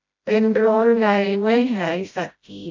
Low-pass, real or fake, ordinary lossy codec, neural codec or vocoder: 7.2 kHz; fake; MP3, 64 kbps; codec, 16 kHz, 0.5 kbps, FreqCodec, smaller model